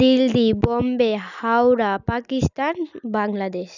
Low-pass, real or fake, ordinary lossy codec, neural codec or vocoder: 7.2 kHz; real; none; none